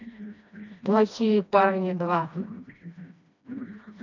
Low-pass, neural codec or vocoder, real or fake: 7.2 kHz; codec, 16 kHz, 1 kbps, FreqCodec, smaller model; fake